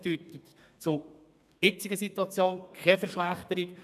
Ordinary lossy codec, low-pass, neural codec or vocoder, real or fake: none; 14.4 kHz; codec, 32 kHz, 1.9 kbps, SNAC; fake